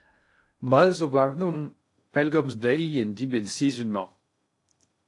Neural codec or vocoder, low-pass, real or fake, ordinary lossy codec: codec, 16 kHz in and 24 kHz out, 0.6 kbps, FocalCodec, streaming, 2048 codes; 10.8 kHz; fake; AAC, 48 kbps